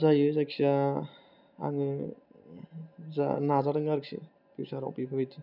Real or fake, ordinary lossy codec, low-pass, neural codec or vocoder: real; none; 5.4 kHz; none